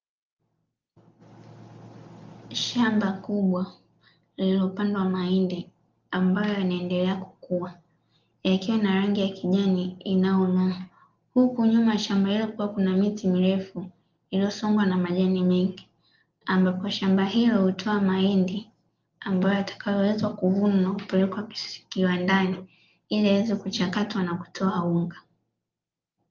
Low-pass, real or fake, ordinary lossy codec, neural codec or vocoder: 7.2 kHz; real; Opus, 32 kbps; none